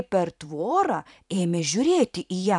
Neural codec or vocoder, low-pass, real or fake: vocoder, 44.1 kHz, 128 mel bands every 512 samples, BigVGAN v2; 10.8 kHz; fake